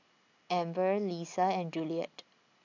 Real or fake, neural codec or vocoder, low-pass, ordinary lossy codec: real; none; 7.2 kHz; AAC, 48 kbps